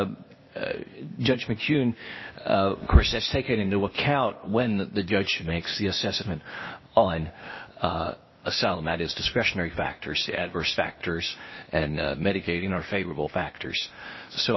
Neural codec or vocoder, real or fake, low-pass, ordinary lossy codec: codec, 16 kHz, 0.8 kbps, ZipCodec; fake; 7.2 kHz; MP3, 24 kbps